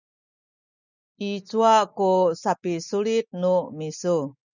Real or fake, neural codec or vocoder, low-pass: real; none; 7.2 kHz